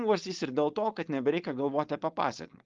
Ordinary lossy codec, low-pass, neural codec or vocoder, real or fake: Opus, 32 kbps; 7.2 kHz; codec, 16 kHz, 4.8 kbps, FACodec; fake